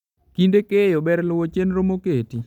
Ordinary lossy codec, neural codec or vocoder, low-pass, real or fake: none; none; 19.8 kHz; real